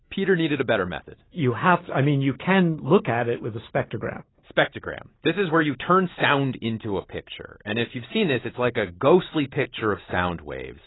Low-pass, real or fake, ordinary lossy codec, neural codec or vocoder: 7.2 kHz; real; AAC, 16 kbps; none